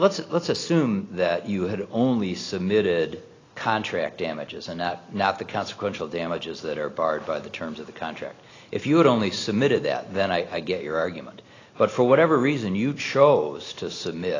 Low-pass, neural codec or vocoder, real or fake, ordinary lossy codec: 7.2 kHz; none; real; AAC, 32 kbps